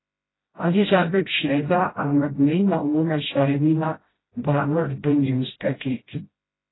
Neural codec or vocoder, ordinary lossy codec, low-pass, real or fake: codec, 16 kHz, 0.5 kbps, FreqCodec, smaller model; AAC, 16 kbps; 7.2 kHz; fake